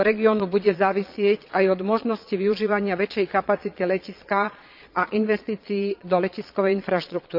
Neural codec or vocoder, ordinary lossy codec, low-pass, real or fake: vocoder, 22.05 kHz, 80 mel bands, Vocos; none; 5.4 kHz; fake